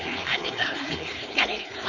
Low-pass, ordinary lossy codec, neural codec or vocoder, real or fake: 7.2 kHz; none; codec, 16 kHz, 4.8 kbps, FACodec; fake